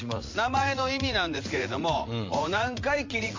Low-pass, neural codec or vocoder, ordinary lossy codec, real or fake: 7.2 kHz; vocoder, 44.1 kHz, 80 mel bands, Vocos; MP3, 48 kbps; fake